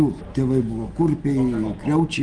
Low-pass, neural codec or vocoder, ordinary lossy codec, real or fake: 9.9 kHz; none; Opus, 24 kbps; real